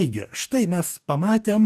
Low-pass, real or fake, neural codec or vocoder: 14.4 kHz; fake; codec, 44.1 kHz, 2.6 kbps, DAC